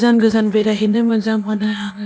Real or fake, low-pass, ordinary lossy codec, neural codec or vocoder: fake; none; none; codec, 16 kHz, 0.8 kbps, ZipCodec